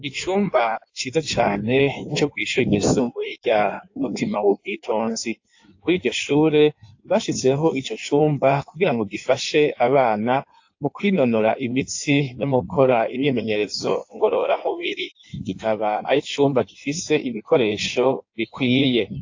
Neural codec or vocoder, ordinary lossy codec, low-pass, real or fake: codec, 16 kHz in and 24 kHz out, 1.1 kbps, FireRedTTS-2 codec; AAC, 48 kbps; 7.2 kHz; fake